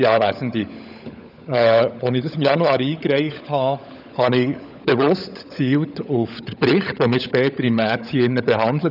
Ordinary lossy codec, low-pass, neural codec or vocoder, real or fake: none; 5.4 kHz; codec, 16 kHz, 16 kbps, FunCodec, trained on LibriTTS, 50 frames a second; fake